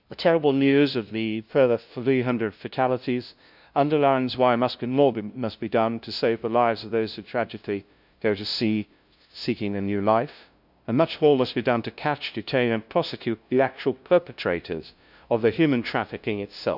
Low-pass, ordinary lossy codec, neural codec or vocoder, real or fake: 5.4 kHz; none; codec, 16 kHz, 0.5 kbps, FunCodec, trained on LibriTTS, 25 frames a second; fake